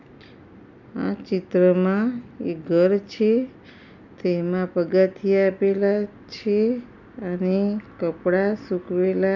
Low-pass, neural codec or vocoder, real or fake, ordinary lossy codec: 7.2 kHz; none; real; none